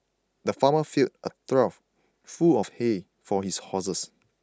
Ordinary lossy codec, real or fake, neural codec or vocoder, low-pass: none; real; none; none